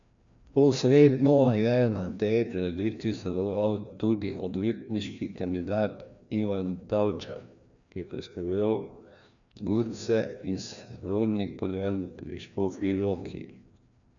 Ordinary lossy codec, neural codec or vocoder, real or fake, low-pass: none; codec, 16 kHz, 1 kbps, FreqCodec, larger model; fake; 7.2 kHz